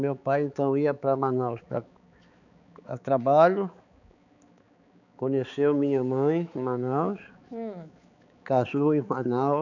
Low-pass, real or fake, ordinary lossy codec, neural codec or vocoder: 7.2 kHz; fake; none; codec, 16 kHz, 4 kbps, X-Codec, HuBERT features, trained on balanced general audio